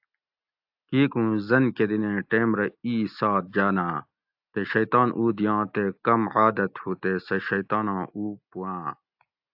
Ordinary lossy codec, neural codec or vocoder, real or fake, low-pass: AAC, 48 kbps; none; real; 5.4 kHz